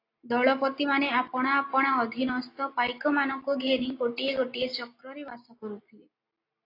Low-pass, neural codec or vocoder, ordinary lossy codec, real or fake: 5.4 kHz; none; AAC, 32 kbps; real